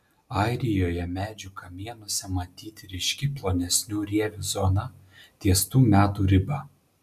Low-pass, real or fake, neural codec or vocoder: 14.4 kHz; real; none